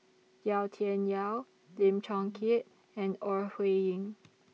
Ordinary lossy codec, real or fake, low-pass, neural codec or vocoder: none; real; none; none